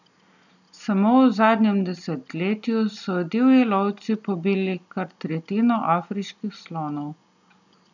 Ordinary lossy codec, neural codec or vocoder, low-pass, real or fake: none; none; 7.2 kHz; real